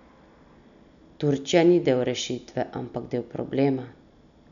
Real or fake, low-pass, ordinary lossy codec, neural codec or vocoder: real; 7.2 kHz; none; none